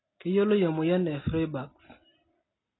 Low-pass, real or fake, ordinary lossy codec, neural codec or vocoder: 7.2 kHz; real; AAC, 16 kbps; none